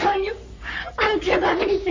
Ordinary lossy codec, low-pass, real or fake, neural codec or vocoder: MP3, 48 kbps; 7.2 kHz; fake; codec, 44.1 kHz, 3.4 kbps, Pupu-Codec